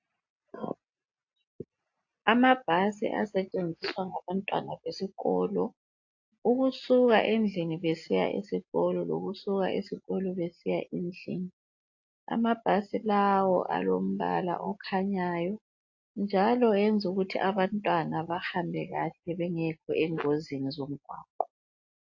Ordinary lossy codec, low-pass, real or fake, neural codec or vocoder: AAC, 48 kbps; 7.2 kHz; real; none